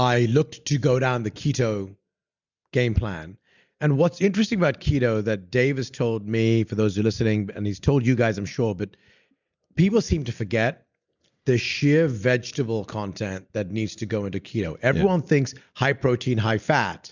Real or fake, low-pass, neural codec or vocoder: real; 7.2 kHz; none